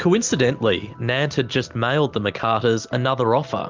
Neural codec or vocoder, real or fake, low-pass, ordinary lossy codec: none; real; 7.2 kHz; Opus, 32 kbps